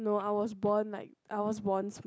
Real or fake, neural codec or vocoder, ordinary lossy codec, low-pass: real; none; none; none